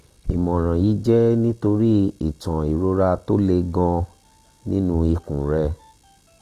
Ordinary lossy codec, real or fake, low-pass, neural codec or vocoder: Opus, 24 kbps; real; 14.4 kHz; none